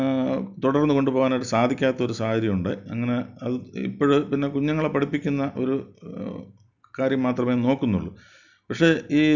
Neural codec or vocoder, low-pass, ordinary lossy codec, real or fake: none; 7.2 kHz; none; real